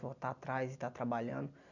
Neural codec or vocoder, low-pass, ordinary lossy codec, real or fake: none; 7.2 kHz; none; real